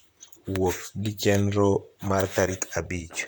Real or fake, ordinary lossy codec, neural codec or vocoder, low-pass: fake; none; vocoder, 44.1 kHz, 128 mel bands, Pupu-Vocoder; none